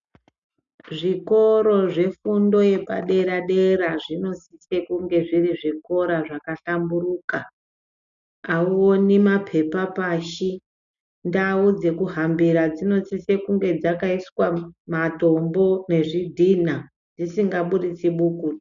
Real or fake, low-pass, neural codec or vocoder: real; 7.2 kHz; none